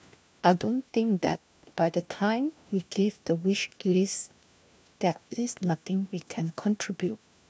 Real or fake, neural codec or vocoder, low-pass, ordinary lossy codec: fake; codec, 16 kHz, 1 kbps, FunCodec, trained on LibriTTS, 50 frames a second; none; none